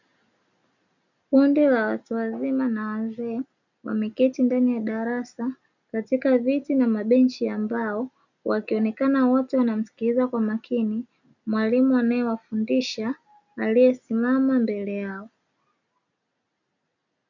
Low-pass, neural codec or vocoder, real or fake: 7.2 kHz; none; real